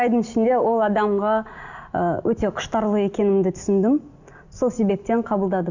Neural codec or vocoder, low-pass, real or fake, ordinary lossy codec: none; 7.2 kHz; real; none